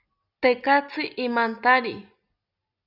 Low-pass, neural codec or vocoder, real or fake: 5.4 kHz; none; real